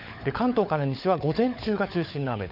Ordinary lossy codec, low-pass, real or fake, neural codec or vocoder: none; 5.4 kHz; fake; codec, 16 kHz, 16 kbps, FunCodec, trained on LibriTTS, 50 frames a second